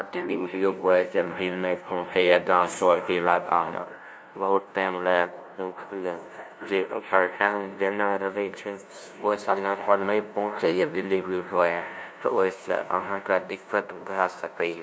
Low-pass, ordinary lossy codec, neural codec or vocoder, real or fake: none; none; codec, 16 kHz, 0.5 kbps, FunCodec, trained on LibriTTS, 25 frames a second; fake